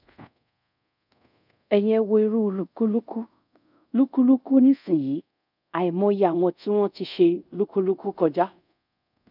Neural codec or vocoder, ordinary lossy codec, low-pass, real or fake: codec, 24 kHz, 0.5 kbps, DualCodec; none; 5.4 kHz; fake